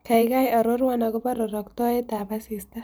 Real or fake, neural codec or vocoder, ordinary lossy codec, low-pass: fake; vocoder, 44.1 kHz, 128 mel bands every 512 samples, BigVGAN v2; none; none